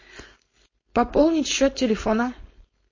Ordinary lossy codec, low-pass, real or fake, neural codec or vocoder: MP3, 32 kbps; 7.2 kHz; fake; codec, 16 kHz, 4.8 kbps, FACodec